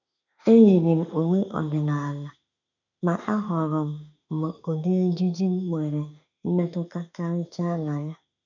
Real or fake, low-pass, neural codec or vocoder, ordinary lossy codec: fake; 7.2 kHz; autoencoder, 48 kHz, 32 numbers a frame, DAC-VAE, trained on Japanese speech; none